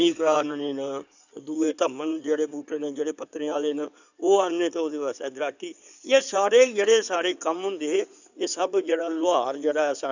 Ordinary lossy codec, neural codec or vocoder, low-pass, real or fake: none; codec, 16 kHz in and 24 kHz out, 2.2 kbps, FireRedTTS-2 codec; 7.2 kHz; fake